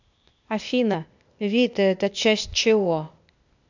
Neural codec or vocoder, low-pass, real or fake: codec, 16 kHz, 0.8 kbps, ZipCodec; 7.2 kHz; fake